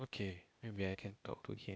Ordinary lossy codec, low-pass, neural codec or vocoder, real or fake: none; none; codec, 16 kHz, 0.8 kbps, ZipCodec; fake